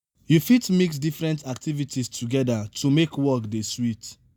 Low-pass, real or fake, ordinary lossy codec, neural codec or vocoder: none; real; none; none